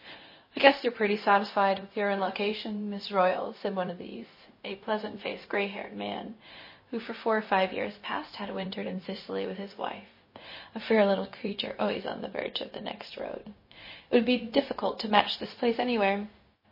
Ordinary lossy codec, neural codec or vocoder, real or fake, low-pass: MP3, 24 kbps; codec, 16 kHz, 0.4 kbps, LongCat-Audio-Codec; fake; 5.4 kHz